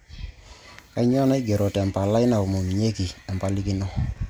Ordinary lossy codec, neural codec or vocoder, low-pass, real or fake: none; none; none; real